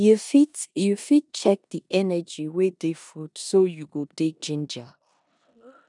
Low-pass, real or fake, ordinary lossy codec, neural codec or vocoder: 10.8 kHz; fake; none; codec, 16 kHz in and 24 kHz out, 0.9 kbps, LongCat-Audio-Codec, four codebook decoder